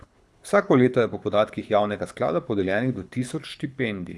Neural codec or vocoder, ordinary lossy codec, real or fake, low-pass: codec, 24 kHz, 6 kbps, HILCodec; none; fake; none